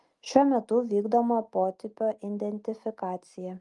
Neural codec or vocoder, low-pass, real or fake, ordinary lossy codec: none; 10.8 kHz; real; Opus, 32 kbps